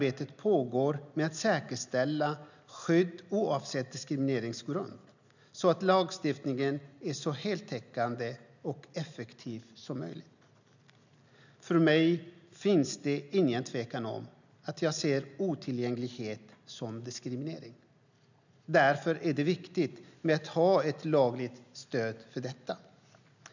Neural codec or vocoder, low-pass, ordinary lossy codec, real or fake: none; 7.2 kHz; none; real